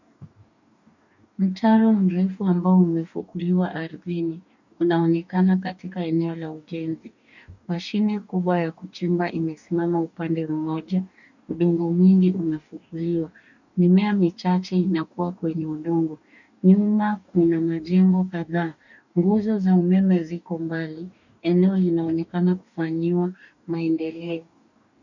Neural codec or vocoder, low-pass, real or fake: codec, 44.1 kHz, 2.6 kbps, DAC; 7.2 kHz; fake